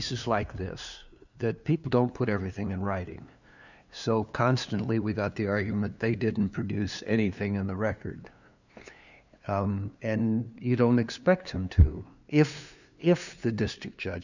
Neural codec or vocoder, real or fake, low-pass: codec, 16 kHz, 2 kbps, FunCodec, trained on LibriTTS, 25 frames a second; fake; 7.2 kHz